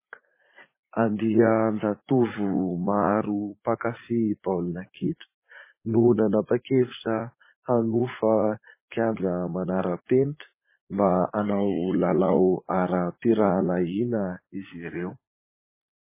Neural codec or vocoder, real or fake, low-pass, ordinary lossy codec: vocoder, 44.1 kHz, 80 mel bands, Vocos; fake; 3.6 kHz; MP3, 16 kbps